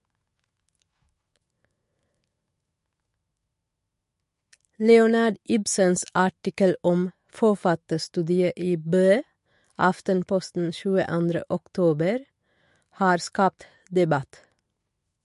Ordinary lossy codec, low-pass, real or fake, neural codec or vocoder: MP3, 48 kbps; 14.4 kHz; fake; autoencoder, 48 kHz, 128 numbers a frame, DAC-VAE, trained on Japanese speech